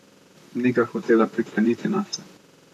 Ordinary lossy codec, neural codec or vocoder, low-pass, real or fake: none; vocoder, 44.1 kHz, 128 mel bands, Pupu-Vocoder; 14.4 kHz; fake